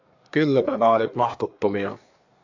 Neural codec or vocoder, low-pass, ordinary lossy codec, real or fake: codec, 24 kHz, 1 kbps, SNAC; 7.2 kHz; AAC, 48 kbps; fake